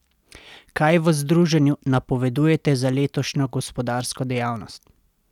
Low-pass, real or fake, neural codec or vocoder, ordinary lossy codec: 19.8 kHz; real; none; none